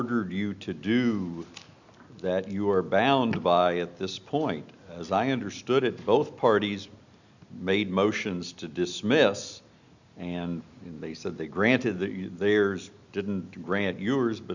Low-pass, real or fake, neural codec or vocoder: 7.2 kHz; real; none